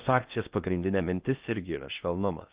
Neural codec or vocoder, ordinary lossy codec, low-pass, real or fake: codec, 16 kHz in and 24 kHz out, 0.6 kbps, FocalCodec, streaming, 4096 codes; Opus, 32 kbps; 3.6 kHz; fake